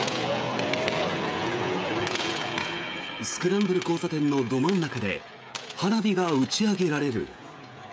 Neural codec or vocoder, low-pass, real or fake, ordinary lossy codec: codec, 16 kHz, 16 kbps, FreqCodec, smaller model; none; fake; none